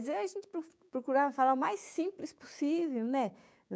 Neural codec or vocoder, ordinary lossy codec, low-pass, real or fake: codec, 16 kHz, 6 kbps, DAC; none; none; fake